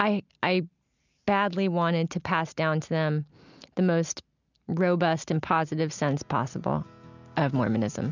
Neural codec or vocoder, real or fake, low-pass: none; real; 7.2 kHz